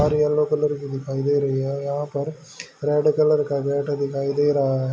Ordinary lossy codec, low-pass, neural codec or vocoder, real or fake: none; none; none; real